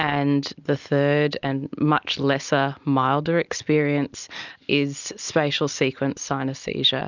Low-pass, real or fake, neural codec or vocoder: 7.2 kHz; real; none